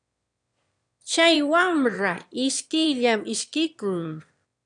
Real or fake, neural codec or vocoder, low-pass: fake; autoencoder, 22.05 kHz, a latent of 192 numbers a frame, VITS, trained on one speaker; 9.9 kHz